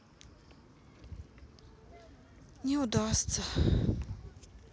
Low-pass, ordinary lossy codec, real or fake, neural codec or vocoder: none; none; real; none